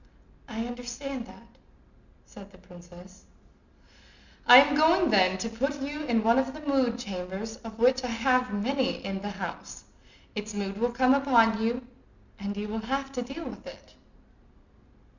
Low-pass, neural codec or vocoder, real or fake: 7.2 kHz; none; real